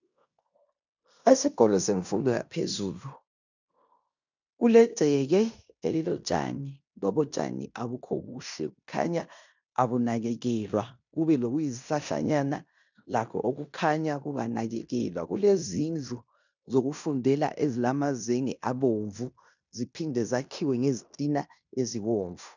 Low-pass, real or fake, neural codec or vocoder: 7.2 kHz; fake; codec, 16 kHz in and 24 kHz out, 0.9 kbps, LongCat-Audio-Codec, fine tuned four codebook decoder